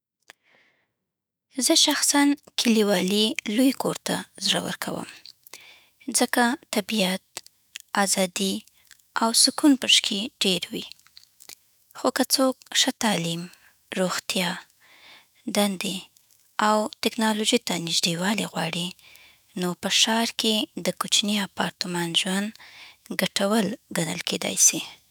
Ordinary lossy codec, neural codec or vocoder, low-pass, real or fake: none; autoencoder, 48 kHz, 128 numbers a frame, DAC-VAE, trained on Japanese speech; none; fake